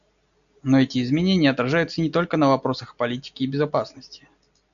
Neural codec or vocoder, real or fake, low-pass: none; real; 7.2 kHz